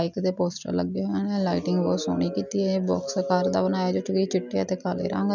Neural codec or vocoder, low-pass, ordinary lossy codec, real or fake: none; 7.2 kHz; none; real